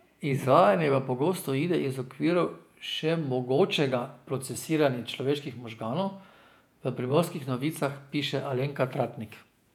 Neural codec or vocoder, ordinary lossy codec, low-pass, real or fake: vocoder, 48 kHz, 128 mel bands, Vocos; none; 19.8 kHz; fake